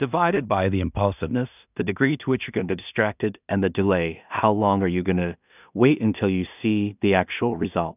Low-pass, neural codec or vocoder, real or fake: 3.6 kHz; codec, 16 kHz in and 24 kHz out, 0.4 kbps, LongCat-Audio-Codec, two codebook decoder; fake